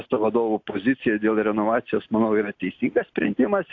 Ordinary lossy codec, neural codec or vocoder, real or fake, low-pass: AAC, 48 kbps; none; real; 7.2 kHz